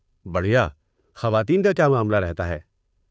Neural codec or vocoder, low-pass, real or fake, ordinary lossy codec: codec, 16 kHz, 2 kbps, FunCodec, trained on Chinese and English, 25 frames a second; none; fake; none